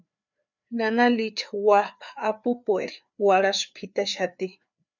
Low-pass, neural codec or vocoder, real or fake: 7.2 kHz; codec, 16 kHz, 4 kbps, FreqCodec, larger model; fake